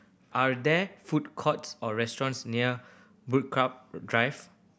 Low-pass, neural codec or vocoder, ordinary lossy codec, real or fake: none; none; none; real